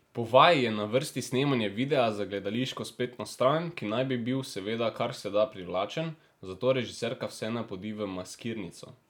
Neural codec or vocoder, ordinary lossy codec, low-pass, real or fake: none; none; 19.8 kHz; real